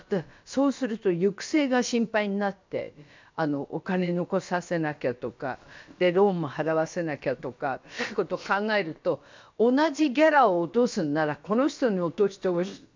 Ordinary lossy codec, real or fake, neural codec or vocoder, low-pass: MP3, 64 kbps; fake; codec, 16 kHz, about 1 kbps, DyCAST, with the encoder's durations; 7.2 kHz